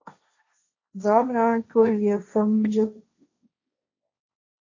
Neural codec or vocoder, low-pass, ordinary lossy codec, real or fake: codec, 16 kHz, 1.1 kbps, Voila-Tokenizer; 7.2 kHz; AAC, 48 kbps; fake